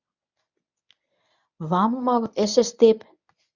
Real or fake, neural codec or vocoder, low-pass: fake; codec, 24 kHz, 0.9 kbps, WavTokenizer, medium speech release version 1; 7.2 kHz